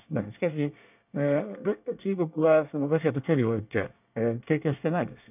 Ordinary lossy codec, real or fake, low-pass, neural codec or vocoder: none; fake; 3.6 kHz; codec, 24 kHz, 1 kbps, SNAC